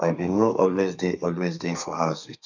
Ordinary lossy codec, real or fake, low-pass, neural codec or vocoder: none; fake; 7.2 kHz; codec, 32 kHz, 1.9 kbps, SNAC